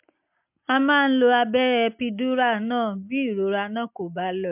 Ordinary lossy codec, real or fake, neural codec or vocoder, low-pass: MP3, 32 kbps; real; none; 3.6 kHz